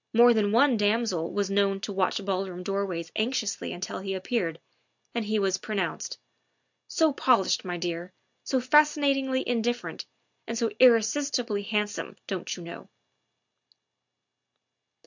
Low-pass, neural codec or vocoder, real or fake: 7.2 kHz; none; real